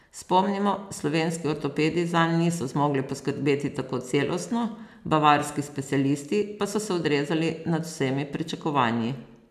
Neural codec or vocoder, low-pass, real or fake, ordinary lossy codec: none; 14.4 kHz; real; none